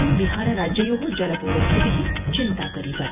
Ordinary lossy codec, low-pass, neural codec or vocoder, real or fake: none; 3.6 kHz; vocoder, 24 kHz, 100 mel bands, Vocos; fake